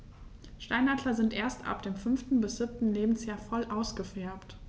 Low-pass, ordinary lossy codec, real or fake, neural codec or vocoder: none; none; real; none